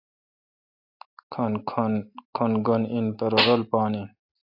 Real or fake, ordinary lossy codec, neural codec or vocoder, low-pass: real; AAC, 48 kbps; none; 5.4 kHz